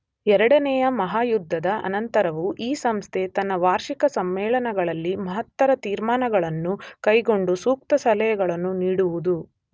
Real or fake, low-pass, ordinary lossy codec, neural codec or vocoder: real; none; none; none